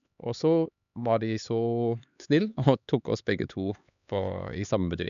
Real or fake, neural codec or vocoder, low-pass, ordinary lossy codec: fake; codec, 16 kHz, 4 kbps, X-Codec, HuBERT features, trained on LibriSpeech; 7.2 kHz; none